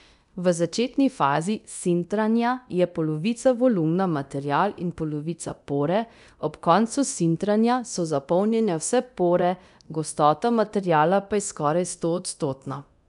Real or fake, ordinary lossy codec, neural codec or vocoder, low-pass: fake; none; codec, 24 kHz, 0.9 kbps, DualCodec; 10.8 kHz